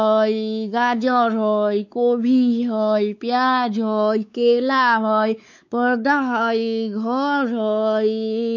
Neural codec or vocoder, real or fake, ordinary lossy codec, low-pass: codec, 16 kHz, 4 kbps, X-Codec, WavLM features, trained on Multilingual LibriSpeech; fake; none; 7.2 kHz